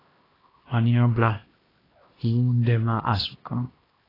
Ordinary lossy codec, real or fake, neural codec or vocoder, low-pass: AAC, 24 kbps; fake; codec, 16 kHz, 1 kbps, X-Codec, HuBERT features, trained on LibriSpeech; 5.4 kHz